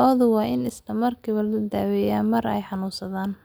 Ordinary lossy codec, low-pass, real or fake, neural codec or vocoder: none; none; real; none